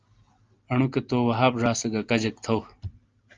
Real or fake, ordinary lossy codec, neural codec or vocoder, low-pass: real; Opus, 32 kbps; none; 7.2 kHz